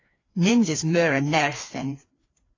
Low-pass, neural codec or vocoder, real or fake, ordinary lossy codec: 7.2 kHz; codec, 16 kHz, 2 kbps, FreqCodec, larger model; fake; AAC, 32 kbps